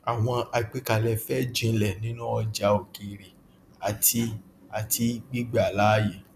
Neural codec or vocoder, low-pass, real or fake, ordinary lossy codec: none; 14.4 kHz; real; none